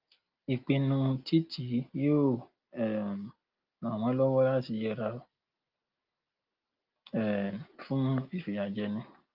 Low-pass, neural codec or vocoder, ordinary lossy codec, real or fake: 5.4 kHz; none; Opus, 24 kbps; real